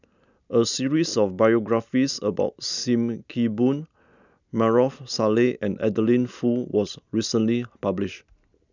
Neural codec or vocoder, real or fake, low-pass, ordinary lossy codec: none; real; 7.2 kHz; none